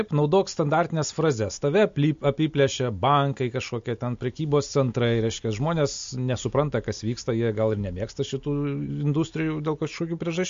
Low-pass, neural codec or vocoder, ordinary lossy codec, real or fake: 7.2 kHz; none; MP3, 48 kbps; real